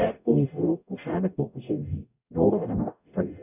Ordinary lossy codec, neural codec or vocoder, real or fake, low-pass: none; codec, 44.1 kHz, 0.9 kbps, DAC; fake; 3.6 kHz